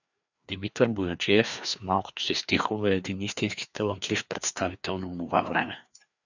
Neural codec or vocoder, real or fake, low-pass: codec, 16 kHz, 2 kbps, FreqCodec, larger model; fake; 7.2 kHz